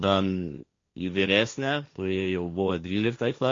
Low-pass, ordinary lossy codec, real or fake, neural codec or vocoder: 7.2 kHz; MP3, 48 kbps; fake; codec, 16 kHz, 1.1 kbps, Voila-Tokenizer